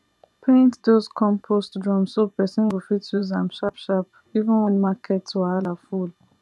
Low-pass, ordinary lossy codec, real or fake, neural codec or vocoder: none; none; fake; vocoder, 24 kHz, 100 mel bands, Vocos